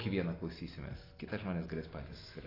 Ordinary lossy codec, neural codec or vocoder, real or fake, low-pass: AAC, 24 kbps; none; real; 5.4 kHz